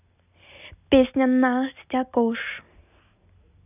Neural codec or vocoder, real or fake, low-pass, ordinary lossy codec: none; real; 3.6 kHz; none